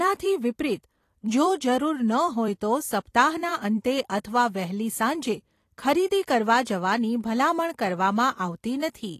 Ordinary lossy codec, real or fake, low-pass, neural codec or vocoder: AAC, 48 kbps; fake; 14.4 kHz; vocoder, 44.1 kHz, 128 mel bands every 256 samples, BigVGAN v2